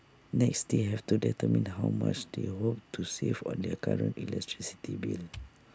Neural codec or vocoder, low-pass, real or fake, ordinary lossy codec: none; none; real; none